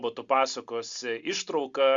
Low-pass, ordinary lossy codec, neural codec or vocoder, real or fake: 7.2 kHz; Opus, 64 kbps; none; real